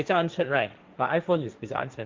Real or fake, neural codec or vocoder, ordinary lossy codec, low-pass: fake; codec, 16 kHz, 1.1 kbps, Voila-Tokenizer; Opus, 24 kbps; 7.2 kHz